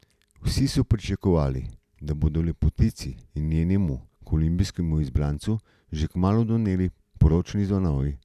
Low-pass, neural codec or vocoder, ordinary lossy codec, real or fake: 14.4 kHz; none; none; real